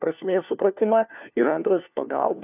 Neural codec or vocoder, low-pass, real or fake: codec, 16 kHz, 1 kbps, FunCodec, trained on LibriTTS, 50 frames a second; 3.6 kHz; fake